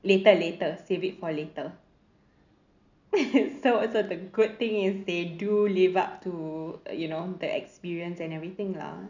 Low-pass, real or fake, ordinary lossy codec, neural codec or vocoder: 7.2 kHz; real; none; none